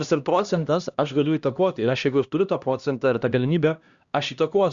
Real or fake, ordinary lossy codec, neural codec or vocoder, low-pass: fake; Opus, 64 kbps; codec, 16 kHz, 1 kbps, X-Codec, HuBERT features, trained on LibriSpeech; 7.2 kHz